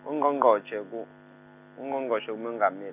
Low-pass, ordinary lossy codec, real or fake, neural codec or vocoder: 3.6 kHz; none; real; none